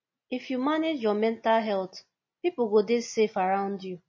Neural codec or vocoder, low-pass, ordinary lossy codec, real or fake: none; 7.2 kHz; MP3, 32 kbps; real